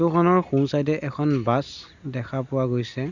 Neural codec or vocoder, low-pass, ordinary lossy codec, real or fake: none; 7.2 kHz; none; real